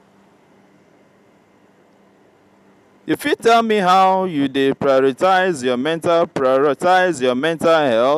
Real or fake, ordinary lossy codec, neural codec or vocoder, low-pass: real; none; none; 14.4 kHz